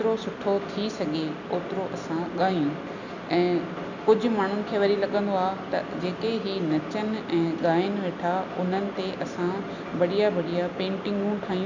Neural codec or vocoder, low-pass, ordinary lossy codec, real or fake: none; 7.2 kHz; none; real